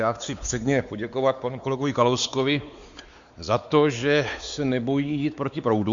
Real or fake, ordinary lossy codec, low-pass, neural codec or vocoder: fake; Opus, 64 kbps; 7.2 kHz; codec, 16 kHz, 4 kbps, X-Codec, WavLM features, trained on Multilingual LibriSpeech